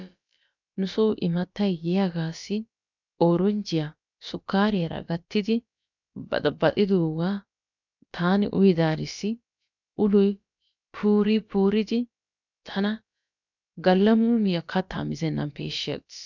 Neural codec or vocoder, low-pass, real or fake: codec, 16 kHz, about 1 kbps, DyCAST, with the encoder's durations; 7.2 kHz; fake